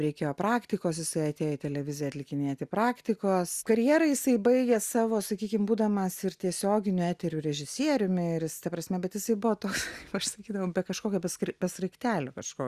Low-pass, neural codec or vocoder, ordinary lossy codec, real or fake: 14.4 kHz; none; Opus, 64 kbps; real